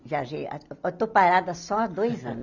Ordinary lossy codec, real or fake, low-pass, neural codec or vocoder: none; real; 7.2 kHz; none